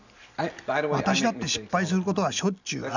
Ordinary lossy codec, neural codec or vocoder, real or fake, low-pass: none; none; real; 7.2 kHz